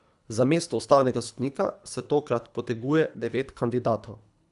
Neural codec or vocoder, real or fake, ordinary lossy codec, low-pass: codec, 24 kHz, 3 kbps, HILCodec; fake; none; 10.8 kHz